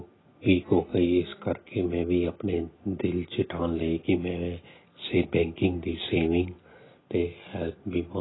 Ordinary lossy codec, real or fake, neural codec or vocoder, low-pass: AAC, 16 kbps; real; none; 7.2 kHz